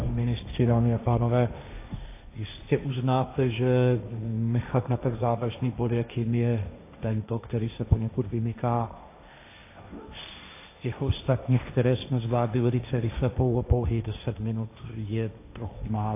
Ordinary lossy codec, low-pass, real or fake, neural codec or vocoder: AAC, 24 kbps; 3.6 kHz; fake; codec, 16 kHz, 1.1 kbps, Voila-Tokenizer